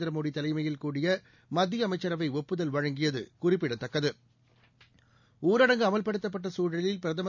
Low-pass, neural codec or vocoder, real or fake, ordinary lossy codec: 7.2 kHz; none; real; none